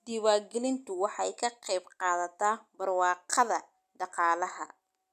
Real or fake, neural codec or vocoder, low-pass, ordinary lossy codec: real; none; 14.4 kHz; none